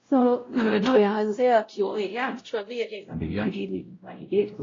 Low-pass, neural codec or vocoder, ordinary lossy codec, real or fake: 7.2 kHz; codec, 16 kHz, 0.5 kbps, X-Codec, WavLM features, trained on Multilingual LibriSpeech; AAC, 32 kbps; fake